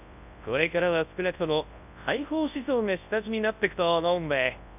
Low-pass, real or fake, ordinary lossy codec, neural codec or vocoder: 3.6 kHz; fake; none; codec, 24 kHz, 0.9 kbps, WavTokenizer, large speech release